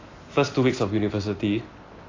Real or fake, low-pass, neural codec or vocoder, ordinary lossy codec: real; 7.2 kHz; none; AAC, 32 kbps